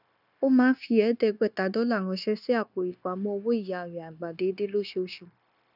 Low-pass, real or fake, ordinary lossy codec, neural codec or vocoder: 5.4 kHz; fake; none; codec, 16 kHz, 0.9 kbps, LongCat-Audio-Codec